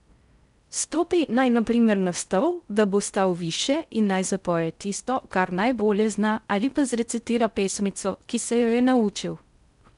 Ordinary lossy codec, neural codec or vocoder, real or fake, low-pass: MP3, 96 kbps; codec, 16 kHz in and 24 kHz out, 0.6 kbps, FocalCodec, streaming, 4096 codes; fake; 10.8 kHz